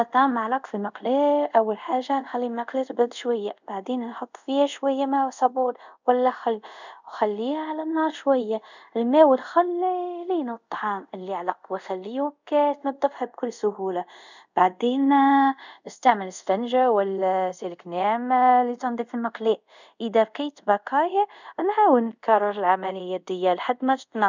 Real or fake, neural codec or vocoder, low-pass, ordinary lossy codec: fake; codec, 24 kHz, 0.5 kbps, DualCodec; 7.2 kHz; none